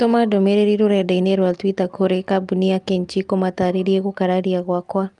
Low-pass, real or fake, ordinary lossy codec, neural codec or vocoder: 10.8 kHz; real; Opus, 32 kbps; none